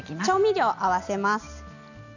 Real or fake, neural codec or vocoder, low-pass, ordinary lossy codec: real; none; 7.2 kHz; none